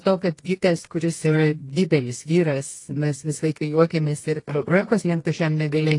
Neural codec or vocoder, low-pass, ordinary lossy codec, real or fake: codec, 24 kHz, 0.9 kbps, WavTokenizer, medium music audio release; 10.8 kHz; AAC, 48 kbps; fake